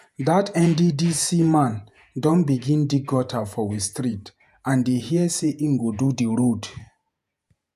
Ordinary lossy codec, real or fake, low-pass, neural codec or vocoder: none; fake; 14.4 kHz; vocoder, 48 kHz, 128 mel bands, Vocos